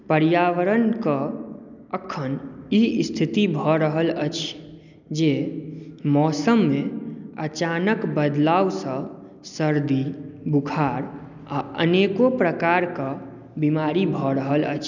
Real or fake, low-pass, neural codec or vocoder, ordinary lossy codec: real; 7.2 kHz; none; none